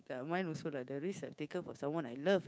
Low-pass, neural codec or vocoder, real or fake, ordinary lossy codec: none; codec, 16 kHz, 6 kbps, DAC; fake; none